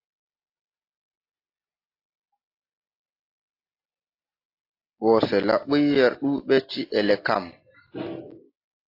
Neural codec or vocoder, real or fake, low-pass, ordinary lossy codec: none; real; 5.4 kHz; AAC, 32 kbps